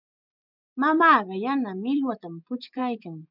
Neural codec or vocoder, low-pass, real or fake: vocoder, 24 kHz, 100 mel bands, Vocos; 5.4 kHz; fake